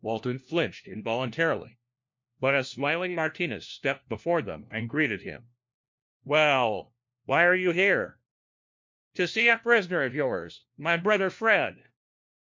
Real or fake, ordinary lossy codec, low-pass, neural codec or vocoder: fake; MP3, 48 kbps; 7.2 kHz; codec, 16 kHz, 1 kbps, FunCodec, trained on LibriTTS, 50 frames a second